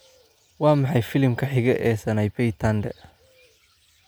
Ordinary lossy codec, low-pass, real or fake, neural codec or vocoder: none; none; real; none